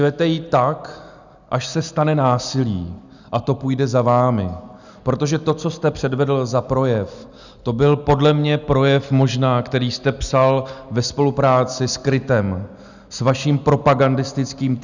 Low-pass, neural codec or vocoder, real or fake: 7.2 kHz; none; real